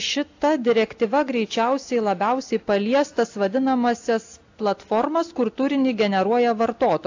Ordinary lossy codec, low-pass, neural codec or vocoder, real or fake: AAC, 48 kbps; 7.2 kHz; none; real